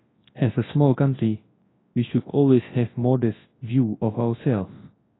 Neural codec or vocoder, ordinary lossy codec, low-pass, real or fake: codec, 24 kHz, 0.9 kbps, WavTokenizer, large speech release; AAC, 16 kbps; 7.2 kHz; fake